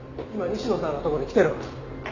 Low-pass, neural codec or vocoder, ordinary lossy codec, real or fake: 7.2 kHz; none; none; real